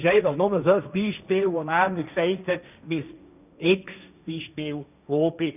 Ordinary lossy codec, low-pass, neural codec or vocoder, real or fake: none; 3.6 kHz; codec, 16 kHz, 1.1 kbps, Voila-Tokenizer; fake